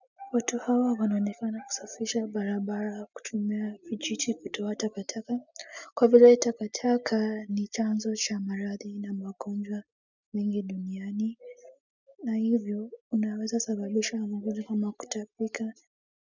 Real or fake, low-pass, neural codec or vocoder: real; 7.2 kHz; none